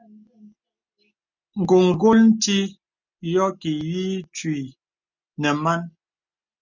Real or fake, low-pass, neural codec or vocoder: real; 7.2 kHz; none